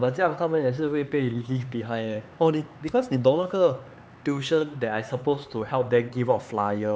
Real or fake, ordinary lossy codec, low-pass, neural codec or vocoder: fake; none; none; codec, 16 kHz, 4 kbps, X-Codec, HuBERT features, trained on LibriSpeech